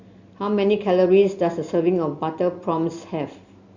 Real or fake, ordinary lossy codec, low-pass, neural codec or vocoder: real; Opus, 64 kbps; 7.2 kHz; none